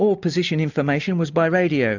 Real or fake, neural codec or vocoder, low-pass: real; none; 7.2 kHz